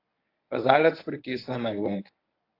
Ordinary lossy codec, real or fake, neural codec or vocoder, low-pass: AAC, 32 kbps; fake; codec, 24 kHz, 0.9 kbps, WavTokenizer, medium speech release version 1; 5.4 kHz